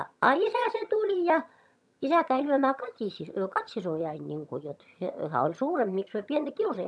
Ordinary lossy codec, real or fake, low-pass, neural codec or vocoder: none; fake; none; vocoder, 22.05 kHz, 80 mel bands, HiFi-GAN